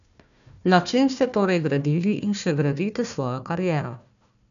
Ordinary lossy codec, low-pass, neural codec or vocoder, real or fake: none; 7.2 kHz; codec, 16 kHz, 1 kbps, FunCodec, trained on Chinese and English, 50 frames a second; fake